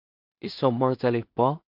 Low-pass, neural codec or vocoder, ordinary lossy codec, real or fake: 5.4 kHz; codec, 16 kHz in and 24 kHz out, 0.4 kbps, LongCat-Audio-Codec, two codebook decoder; MP3, 48 kbps; fake